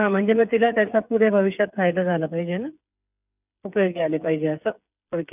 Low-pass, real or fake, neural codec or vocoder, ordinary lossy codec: 3.6 kHz; fake; codec, 16 kHz, 8 kbps, FreqCodec, smaller model; none